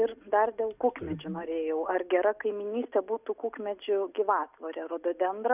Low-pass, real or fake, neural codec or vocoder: 3.6 kHz; real; none